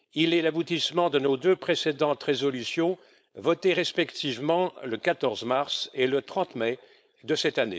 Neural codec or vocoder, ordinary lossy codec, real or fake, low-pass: codec, 16 kHz, 4.8 kbps, FACodec; none; fake; none